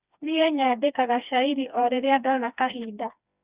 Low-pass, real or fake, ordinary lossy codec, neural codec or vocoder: 3.6 kHz; fake; Opus, 64 kbps; codec, 16 kHz, 2 kbps, FreqCodec, smaller model